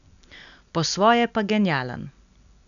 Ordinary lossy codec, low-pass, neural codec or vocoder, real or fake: none; 7.2 kHz; none; real